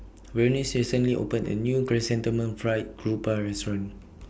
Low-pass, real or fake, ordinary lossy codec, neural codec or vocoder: none; real; none; none